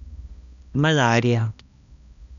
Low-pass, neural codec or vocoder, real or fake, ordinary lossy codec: 7.2 kHz; codec, 16 kHz, 1 kbps, X-Codec, HuBERT features, trained on balanced general audio; fake; none